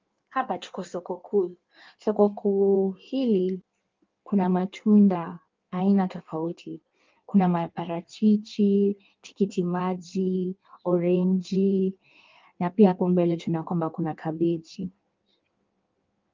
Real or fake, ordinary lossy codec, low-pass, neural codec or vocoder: fake; Opus, 32 kbps; 7.2 kHz; codec, 16 kHz in and 24 kHz out, 1.1 kbps, FireRedTTS-2 codec